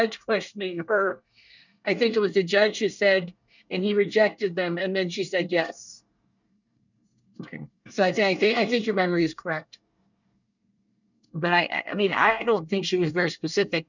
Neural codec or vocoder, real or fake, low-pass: codec, 24 kHz, 1 kbps, SNAC; fake; 7.2 kHz